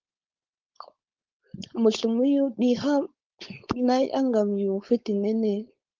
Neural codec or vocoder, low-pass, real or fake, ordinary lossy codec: codec, 16 kHz, 4.8 kbps, FACodec; 7.2 kHz; fake; Opus, 32 kbps